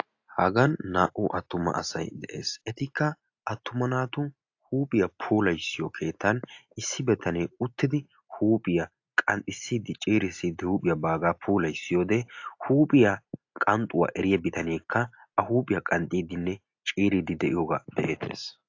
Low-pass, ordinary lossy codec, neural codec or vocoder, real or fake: 7.2 kHz; AAC, 48 kbps; none; real